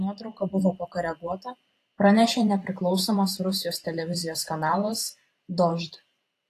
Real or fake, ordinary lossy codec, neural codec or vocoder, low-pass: fake; AAC, 48 kbps; vocoder, 44.1 kHz, 128 mel bands every 512 samples, BigVGAN v2; 14.4 kHz